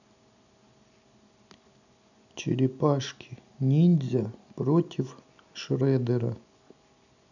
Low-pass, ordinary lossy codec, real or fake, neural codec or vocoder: 7.2 kHz; none; real; none